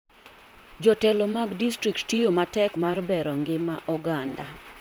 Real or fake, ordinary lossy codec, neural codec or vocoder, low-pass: fake; none; vocoder, 44.1 kHz, 128 mel bands, Pupu-Vocoder; none